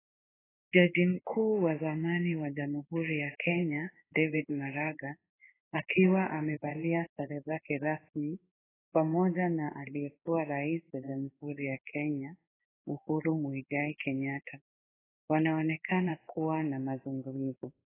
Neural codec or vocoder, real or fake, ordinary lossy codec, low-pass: codec, 16 kHz in and 24 kHz out, 1 kbps, XY-Tokenizer; fake; AAC, 16 kbps; 3.6 kHz